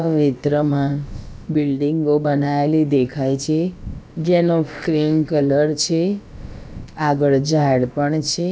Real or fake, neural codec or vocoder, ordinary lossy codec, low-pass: fake; codec, 16 kHz, about 1 kbps, DyCAST, with the encoder's durations; none; none